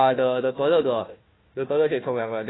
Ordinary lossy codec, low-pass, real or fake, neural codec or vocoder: AAC, 16 kbps; 7.2 kHz; fake; codec, 16 kHz, 1 kbps, FunCodec, trained on Chinese and English, 50 frames a second